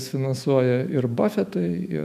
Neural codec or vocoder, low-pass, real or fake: autoencoder, 48 kHz, 128 numbers a frame, DAC-VAE, trained on Japanese speech; 14.4 kHz; fake